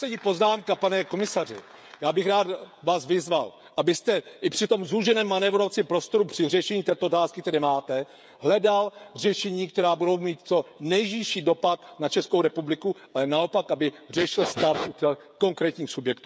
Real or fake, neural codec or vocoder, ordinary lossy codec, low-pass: fake; codec, 16 kHz, 16 kbps, FreqCodec, smaller model; none; none